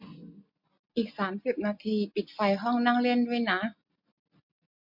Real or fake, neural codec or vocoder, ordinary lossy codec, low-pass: real; none; MP3, 48 kbps; 5.4 kHz